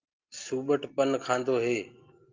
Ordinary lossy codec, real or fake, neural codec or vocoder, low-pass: Opus, 32 kbps; real; none; 7.2 kHz